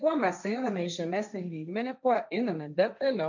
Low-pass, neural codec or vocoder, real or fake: 7.2 kHz; codec, 16 kHz, 1.1 kbps, Voila-Tokenizer; fake